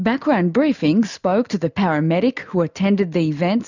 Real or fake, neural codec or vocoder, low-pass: real; none; 7.2 kHz